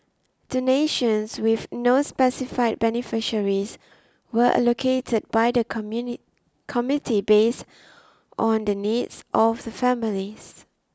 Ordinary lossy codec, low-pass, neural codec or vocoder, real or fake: none; none; none; real